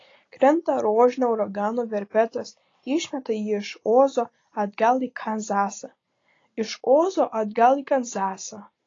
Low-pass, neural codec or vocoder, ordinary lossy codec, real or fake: 7.2 kHz; none; AAC, 32 kbps; real